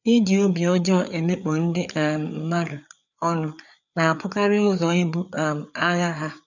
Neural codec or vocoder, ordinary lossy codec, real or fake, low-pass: codec, 16 kHz, 4 kbps, FreqCodec, larger model; none; fake; 7.2 kHz